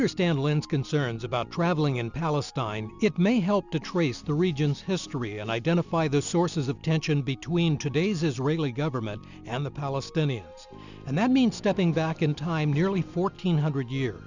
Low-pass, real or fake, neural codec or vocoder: 7.2 kHz; real; none